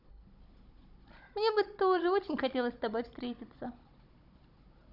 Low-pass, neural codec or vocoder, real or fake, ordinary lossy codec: 5.4 kHz; codec, 16 kHz, 16 kbps, FunCodec, trained on Chinese and English, 50 frames a second; fake; none